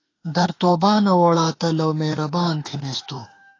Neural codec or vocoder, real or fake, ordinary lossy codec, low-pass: autoencoder, 48 kHz, 32 numbers a frame, DAC-VAE, trained on Japanese speech; fake; AAC, 32 kbps; 7.2 kHz